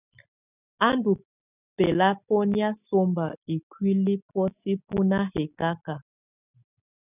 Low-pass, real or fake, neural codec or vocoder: 3.6 kHz; real; none